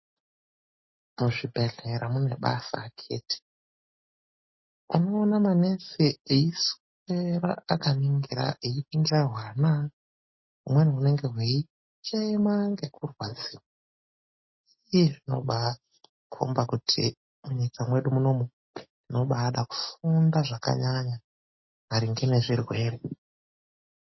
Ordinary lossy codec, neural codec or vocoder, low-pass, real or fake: MP3, 24 kbps; none; 7.2 kHz; real